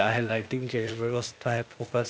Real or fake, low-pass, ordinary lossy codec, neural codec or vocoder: fake; none; none; codec, 16 kHz, 0.8 kbps, ZipCodec